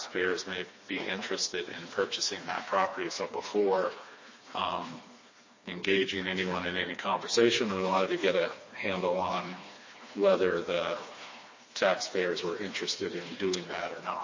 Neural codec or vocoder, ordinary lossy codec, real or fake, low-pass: codec, 16 kHz, 2 kbps, FreqCodec, smaller model; MP3, 32 kbps; fake; 7.2 kHz